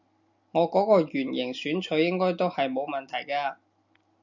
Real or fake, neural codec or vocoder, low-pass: real; none; 7.2 kHz